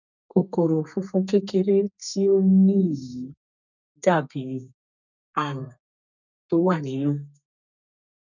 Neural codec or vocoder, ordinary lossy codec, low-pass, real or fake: codec, 32 kHz, 1.9 kbps, SNAC; none; 7.2 kHz; fake